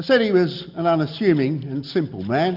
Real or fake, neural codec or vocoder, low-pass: real; none; 5.4 kHz